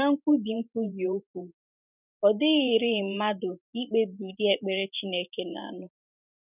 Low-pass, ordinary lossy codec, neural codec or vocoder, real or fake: 3.6 kHz; none; vocoder, 44.1 kHz, 128 mel bands every 512 samples, BigVGAN v2; fake